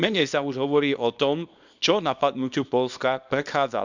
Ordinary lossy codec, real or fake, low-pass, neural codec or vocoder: none; fake; 7.2 kHz; codec, 24 kHz, 0.9 kbps, WavTokenizer, small release